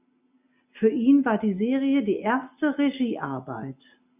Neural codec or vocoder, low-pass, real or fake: none; 3.6 kHz; real